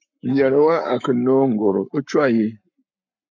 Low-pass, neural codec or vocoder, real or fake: 7.2 kHz; codec, 44.1 kHz, 7.8 kbps, Pupu-Codec; fake